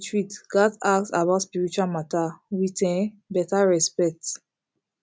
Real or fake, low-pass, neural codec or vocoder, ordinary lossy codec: real; none; none; none